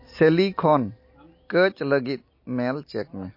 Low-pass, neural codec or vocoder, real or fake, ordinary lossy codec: 5.4 kHz; none; real; MP3, 32 kbps